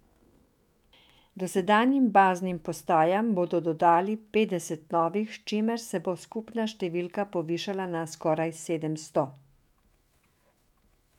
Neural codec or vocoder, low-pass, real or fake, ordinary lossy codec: autoencoder, 48 kHz, 128 numbers a frame, DAC-VAE, trained on Japanese speech; 19.8 kHz; fake; MP3, 96 kbps